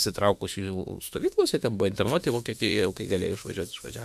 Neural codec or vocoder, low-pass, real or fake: autoencoder, 48 kHz, 32 numbers a frame, DAC-VAE, trained on Japanese speech; 14.4 kHz; fake